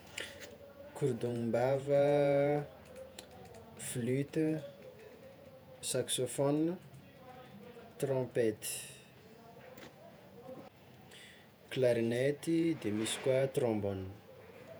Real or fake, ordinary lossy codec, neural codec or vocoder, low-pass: fake; none; vocoder, 48 kHz, 128 mel bands, Vocos; none